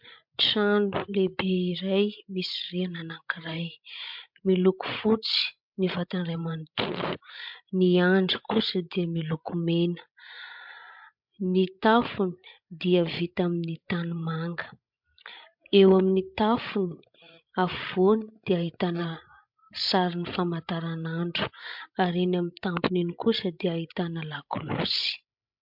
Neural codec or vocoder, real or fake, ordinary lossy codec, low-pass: codec, 16 kHz, 16 kbps, FreqCodec, larger model; fake; MP3, 48 kbps; 5.4 kHz